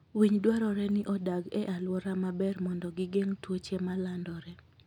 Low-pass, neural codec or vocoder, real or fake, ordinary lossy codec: 19.8 kHz; none; real; none